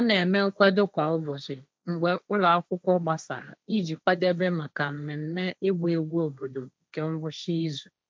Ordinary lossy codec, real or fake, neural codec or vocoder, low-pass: none; fake; codec, 16 kHz, 1.1 kbps, Voila-Tokenizer; none